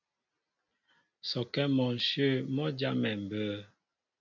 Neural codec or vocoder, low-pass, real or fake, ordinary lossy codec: none; 7.2 kHz; real; MP3, 64 kbps